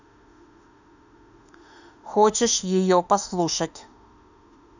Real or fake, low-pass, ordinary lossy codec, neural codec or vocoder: fake; 7.2 kHz; none; autoencoder, 48 kHz, 32 numbers a frame, DAC-VAE, trained on Japanese speech